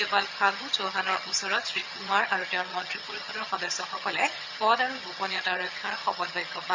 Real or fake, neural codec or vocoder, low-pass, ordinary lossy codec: fake; vocoder, 22.05 kHz, 80 mel bands, HiFi-GAN; 7.2 kHz; MP3, 64 kbps